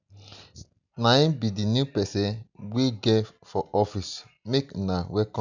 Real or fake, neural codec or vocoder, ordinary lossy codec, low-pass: real; none; none; 7.2 kHz